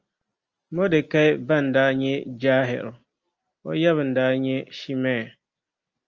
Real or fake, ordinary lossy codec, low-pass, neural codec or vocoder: real; Opus, 24 kbps; 7.2 kHz; none